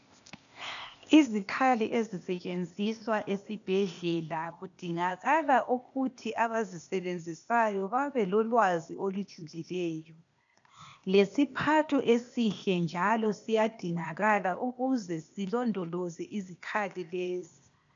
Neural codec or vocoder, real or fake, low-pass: codec, 16 kHz, 0.8 kbps, ZipCodec; fake; 7.2 kHz